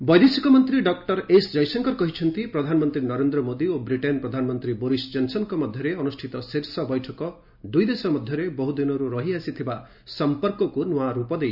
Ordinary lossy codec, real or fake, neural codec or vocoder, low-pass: none; real; none; 5.4 kHz